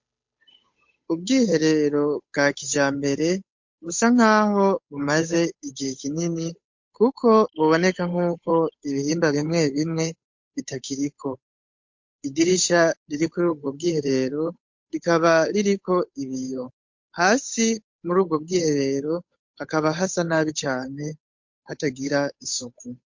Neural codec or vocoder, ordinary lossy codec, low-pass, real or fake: codec, 16 kHz, 8 kbps, FunCodec, trained on Chinese and English, 25 frames a second; MP3, 48 kbps; 7.2 kHz; fake